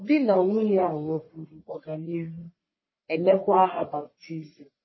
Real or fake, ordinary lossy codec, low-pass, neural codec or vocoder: fake; MP3, 24 kbps; 7.2 kHz; codec, 44.1 kHz, 1.7 kbps, Pupu-Codec